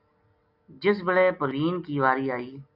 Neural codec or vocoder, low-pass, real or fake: none; 5.4 kHz; real